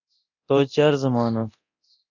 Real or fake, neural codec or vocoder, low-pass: fake; codec, 24 kHz, 0.9 kbps, DualCodec; 7.2 kHz